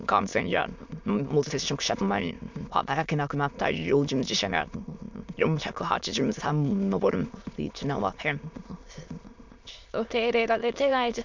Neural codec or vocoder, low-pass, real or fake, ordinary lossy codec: autoencoder, 22.05 kHz, a latent of 192 numbers a frame, VITS, trained on many speakers; 7.2 kHz; fake; MP3, 64 kbps